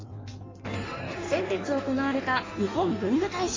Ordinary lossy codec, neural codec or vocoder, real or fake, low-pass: AAC, 32 kbps; codec, 16 kHz in and 24 kHz out, 1.1 kbps, FireRedTTS-2 codec; fake; 7.2 kHz